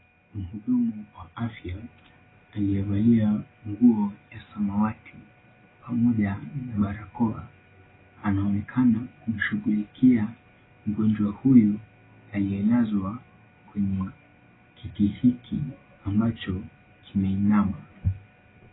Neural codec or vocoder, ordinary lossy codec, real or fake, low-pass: none; AAC, 16 kbps; real; 7.2 kHz